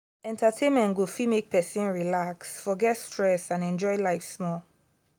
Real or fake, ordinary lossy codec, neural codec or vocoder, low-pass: real; none; none; none